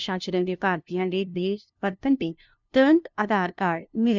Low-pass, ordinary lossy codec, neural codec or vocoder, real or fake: 7.2 kHz; none; codec, 16 kHz, 0.5 kbps, FunCodec, trained on LibriTTS, 25 frames a second; fake